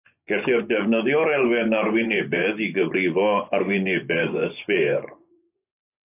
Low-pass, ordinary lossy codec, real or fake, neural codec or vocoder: 3.6 kHz; AAC, 24 kbps; fake; vocoder, 24 kHz, 100 mel bands, Vocos